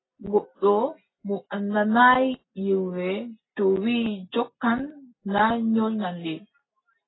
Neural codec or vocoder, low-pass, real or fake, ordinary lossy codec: none; 7.2 kHz; real; AAC, 16 kbps